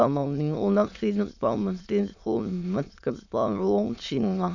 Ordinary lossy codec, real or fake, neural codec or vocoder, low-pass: none; fake; autoencoder, 22.05 kHz, a latent of 192 numbers a frame, VITS, trained on many speakers; 7.2 kHz